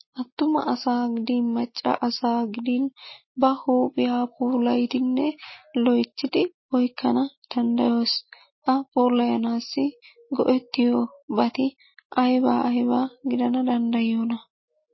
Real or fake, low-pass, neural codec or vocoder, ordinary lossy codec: real; 7.2 kHz; none; MP3, 24 kbps